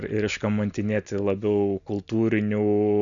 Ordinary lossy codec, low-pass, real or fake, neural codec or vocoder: MP3, 96 kbps; 7.2 kHz; real; none